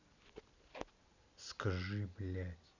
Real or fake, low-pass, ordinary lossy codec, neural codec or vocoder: real; 7.2 kHz; none; none